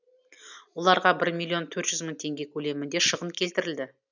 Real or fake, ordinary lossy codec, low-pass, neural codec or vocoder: real; none; 7.2 kHz; none